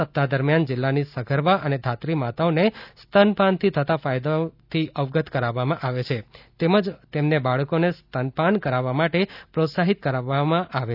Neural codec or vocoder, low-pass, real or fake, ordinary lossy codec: none; 5.4 kHz; real; none